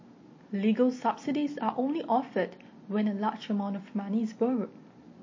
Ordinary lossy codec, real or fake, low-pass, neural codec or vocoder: MP3, 32 kbps; real; 7.2 kHz; none